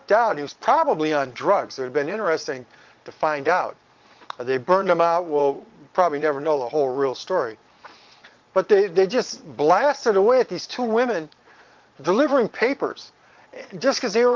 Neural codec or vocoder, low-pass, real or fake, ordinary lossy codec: vocoder, 22.05 kHz, 80 mel bands, Vocos; 7.2 kHz; fake; Opus, 32 kbps